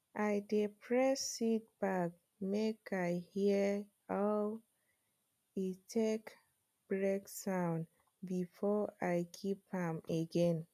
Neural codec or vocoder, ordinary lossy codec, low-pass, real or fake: none; none; 14.4 kHz; real